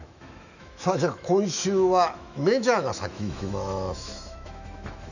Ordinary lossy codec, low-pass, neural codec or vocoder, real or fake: none; 7.2 kHz; autoencoder, 48 kHz, 128 numbers a frame, DAC-VAE, trained on Japanese speech; fake